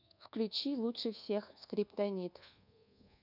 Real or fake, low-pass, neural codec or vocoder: fake; 5.4 kHz; codec, 24 kHz, 1.2 kbps, DualCodec